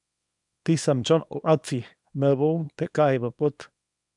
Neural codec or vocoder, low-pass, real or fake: codec, 24 kHz, 0.9 kbps, WavTokenizer, small release; 10.8 kHz; fake